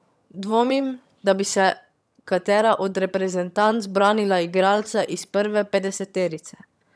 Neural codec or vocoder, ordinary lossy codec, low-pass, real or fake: vocoder, 22.05 kHz, 80 mel bands, HiFi-GAN; none; none; fake